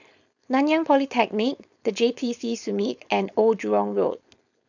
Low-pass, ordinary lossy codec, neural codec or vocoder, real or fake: 7.2 kHz; none; codec, 16 kHz, 4.8 kbps, FACodec; fake